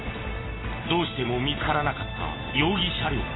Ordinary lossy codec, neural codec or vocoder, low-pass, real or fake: AAC, 16 kbps; none; 7.2 kHz; real